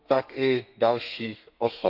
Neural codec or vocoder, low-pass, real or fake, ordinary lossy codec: codec, 32 kHz, 1.9 kbps, SNAC; 5.4 kHz; fake; none